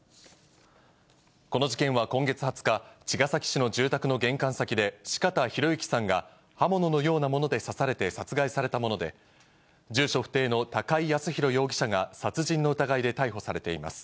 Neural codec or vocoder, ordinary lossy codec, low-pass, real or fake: none; none; none; real